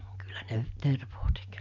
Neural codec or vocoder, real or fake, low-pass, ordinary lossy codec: none; real; 7.2 kHz; none